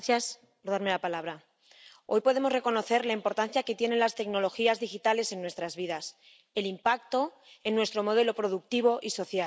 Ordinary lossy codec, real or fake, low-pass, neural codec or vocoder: none; real; none; none